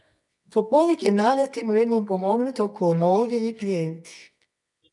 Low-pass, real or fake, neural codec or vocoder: 10.8 kHz; fake; codec, 24 kHz, 0.9 kbps, WavTokenizer, medium music audio release